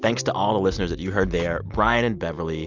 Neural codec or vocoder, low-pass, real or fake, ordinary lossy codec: none; 7.2 kHz; real; Opus, 64 kbps